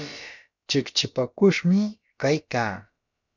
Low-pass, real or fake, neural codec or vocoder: 7.2 kHz; fake; codec, 16 kHz, about 1 kbps, DyCAST, with the encoder's durations